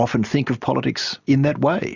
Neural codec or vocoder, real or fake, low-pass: none; real; 7.2 kHz